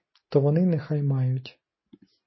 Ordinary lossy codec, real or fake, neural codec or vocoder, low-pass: MP3, 24 kbps; real; none; 7.2 kHz